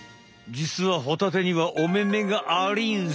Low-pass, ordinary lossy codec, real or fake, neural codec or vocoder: none; none; real; none